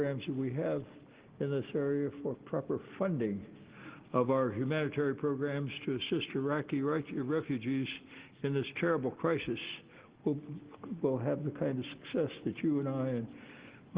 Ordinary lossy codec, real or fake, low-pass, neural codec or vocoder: Opus, 16 kbps; real; 3.6 kHz; none